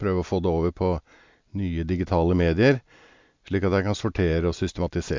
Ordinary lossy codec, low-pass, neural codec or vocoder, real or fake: MP3, 64 kbps; 7.2 kHz; none; real